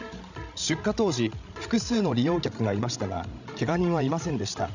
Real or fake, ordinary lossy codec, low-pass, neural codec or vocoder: fake; none; 7.2 kHz; codec, 16 kHz, 16 kbps, FreqCodec, larger model